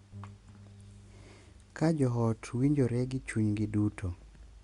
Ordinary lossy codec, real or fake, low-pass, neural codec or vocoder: Opus, 64 kbps; real; 10.8 kHz; none